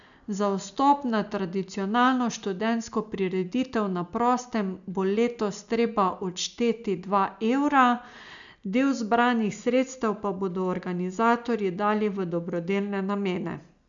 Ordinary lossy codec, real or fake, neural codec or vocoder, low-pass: none; real; none; 7.2 kHz